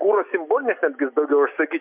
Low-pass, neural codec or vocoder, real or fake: 3.6 kHz; none; real